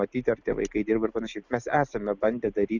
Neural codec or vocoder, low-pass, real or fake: none; 7.2 kHz; real